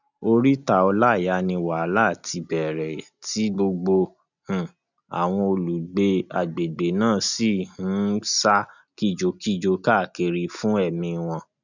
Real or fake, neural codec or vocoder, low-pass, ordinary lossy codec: real; none; 7.2 kHz; none